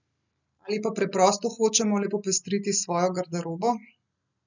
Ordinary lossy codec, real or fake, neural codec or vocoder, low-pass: none; real; none; 7.2 kHz